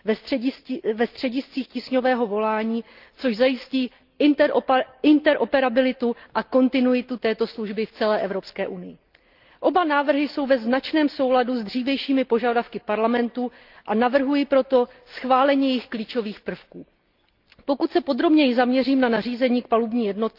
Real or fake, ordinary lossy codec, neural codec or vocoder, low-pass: real; Opus, 32 kbps; none; 5.4 kHz